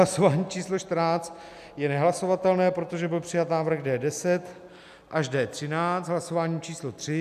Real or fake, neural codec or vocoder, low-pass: real; none; 14.4 kHz